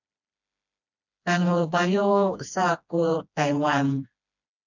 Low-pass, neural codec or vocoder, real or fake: 7.2 kHz; codec, 16 kHz, 1 kbps, FreqCodec, smaller model; fake